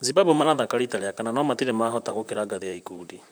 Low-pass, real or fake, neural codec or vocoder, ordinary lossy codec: none; fake; vocoder, 44.1 kHz, 128 mel bands, Pupu-Vocoder; none